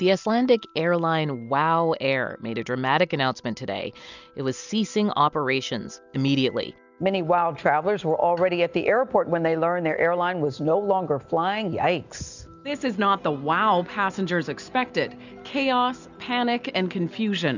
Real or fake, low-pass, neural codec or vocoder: real; 7.2 kHz; none